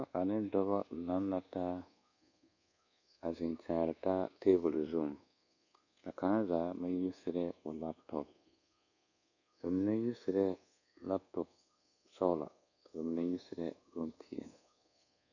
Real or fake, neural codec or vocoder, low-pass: fake; codec, 24 kHz, 1.2 kbps, DualCodec; 7.2 kHz